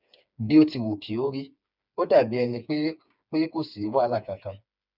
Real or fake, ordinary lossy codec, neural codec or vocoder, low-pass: fake; none; codec, 16 kHz, 4 kbps, FreqCodec, smaller model; 5.4 kHz